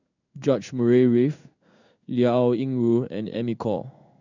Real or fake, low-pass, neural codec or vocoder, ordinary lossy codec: fake; 7.2 kHz; codec, 16 kHz in and 24 kHz out, 1 kbps, XY-Tokenizer; none